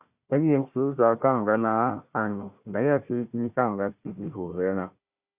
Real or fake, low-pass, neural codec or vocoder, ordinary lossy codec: fake; 3.6 kHz; codec, 16 kHz, 1 kbps, FunCodec, trained on Chinese and English, 50 frames a second; Opus, 64 kbps